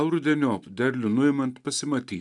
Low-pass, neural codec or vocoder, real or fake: 10.8 kHz; none; real